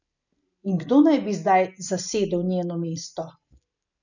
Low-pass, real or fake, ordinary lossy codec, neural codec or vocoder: 7.2 kHz; real; none; none